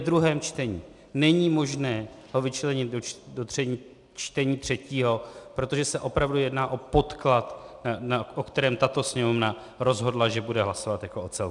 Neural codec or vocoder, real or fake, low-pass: none; real; 10.8 kHz